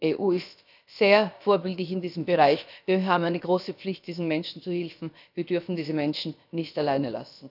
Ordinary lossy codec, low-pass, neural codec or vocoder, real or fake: none; 5.4 kHz; codec, 16 kHz, about 1 kbps, DyCAST, with the encoder's durations; fake